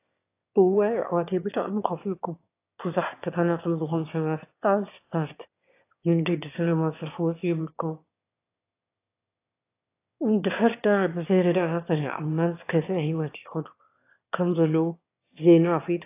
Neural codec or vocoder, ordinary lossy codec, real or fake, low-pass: autoencoder, 22.05 kHz, a latent of 192 numbers a frame, VITS, trained on one speaker; AAC, 24 kbps; fake; 3.6 kHz